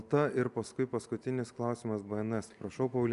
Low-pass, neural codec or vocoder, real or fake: 10.8 kHz; none; real